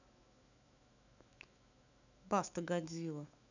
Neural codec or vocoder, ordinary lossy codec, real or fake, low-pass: autoencoder, 48 kHz, 128 numbers a frame, DAC-VAE, trained on Japanese speech; none; fake; 7.2 kHz